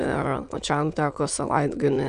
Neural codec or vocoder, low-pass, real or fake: autoencoder, 22.05 kHz, a latent of 192 numbers a frame, VITS, trained on many speakers; 9.9 kHz; fake